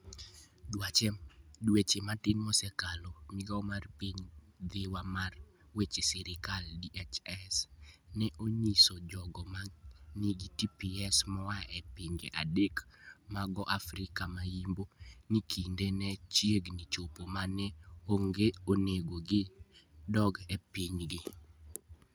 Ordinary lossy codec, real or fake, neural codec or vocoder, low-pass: none; real; none; none